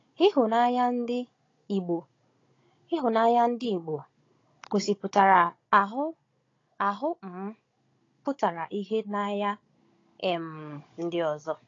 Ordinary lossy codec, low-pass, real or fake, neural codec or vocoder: AAC, 32 kbps; 7.2 kHz; fake; codec, 16 kHz, 16 kbps, FunCodec, trained on Chinese and English, 50 frames a second